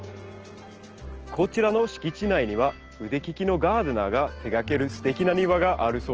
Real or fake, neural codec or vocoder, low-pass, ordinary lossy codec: real; none; 7.2 kHz; Opus, 16 kbps